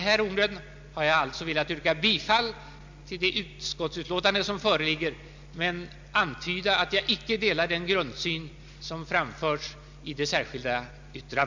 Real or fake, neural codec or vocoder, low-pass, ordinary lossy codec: real; none; 7.2 kHz; MP3, 64 kbps